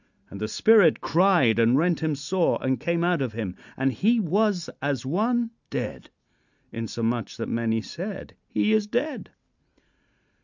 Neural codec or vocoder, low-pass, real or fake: none; 7.2 kHz; real